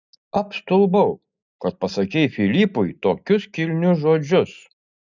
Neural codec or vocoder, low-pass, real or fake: none; 7.2 kHz; real